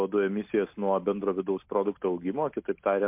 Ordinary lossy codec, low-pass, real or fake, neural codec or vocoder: MP3, 32 kbps; 3.6 kHz; real; none